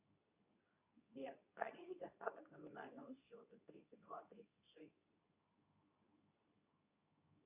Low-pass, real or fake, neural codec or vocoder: 3.6 kHz; fake; codec, 24 kHz, 0.9 kbps, WavTokenizer, medium speech release version 2